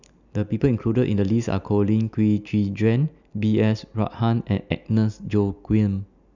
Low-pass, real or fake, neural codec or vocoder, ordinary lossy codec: 7.2 kHz; real; none; none